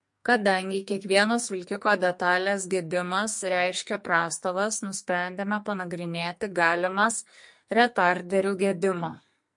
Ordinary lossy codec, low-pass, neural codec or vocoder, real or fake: MP3, 48 kbps; 10.8 kHz; codec, 44.1 kHz, 2.6 kbps, SNAC; fake